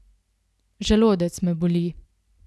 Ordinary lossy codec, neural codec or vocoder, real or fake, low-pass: none; none; real; none